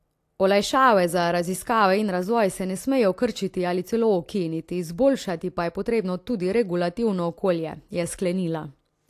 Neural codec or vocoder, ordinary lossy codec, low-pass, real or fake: none; AAC, 64 kbps; 14.4 kHz; real